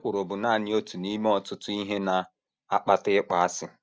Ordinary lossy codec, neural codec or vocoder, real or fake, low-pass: none; none; real; none